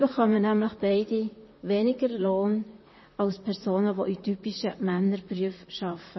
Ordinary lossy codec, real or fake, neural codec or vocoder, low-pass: MP3, 24 kbps; fake; vocoder, 44.1 kHz, 128 mel bands, Pupu-Vocoder; 7.2 kHz